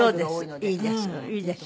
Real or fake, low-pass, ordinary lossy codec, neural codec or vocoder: real; none; none; none